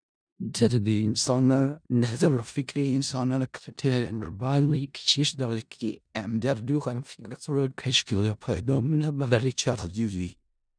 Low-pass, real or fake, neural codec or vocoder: 9.9 kHz; fake; codec, 16 kHz in and 24 kHz out, 0.4 kbps, LongCat-Audio-Codec, four codebook decoder